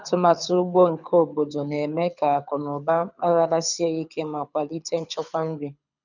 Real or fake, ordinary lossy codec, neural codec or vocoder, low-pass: fake; none; codec, 24 kHz, 6 kbps, HILCodec; 7.2 kHz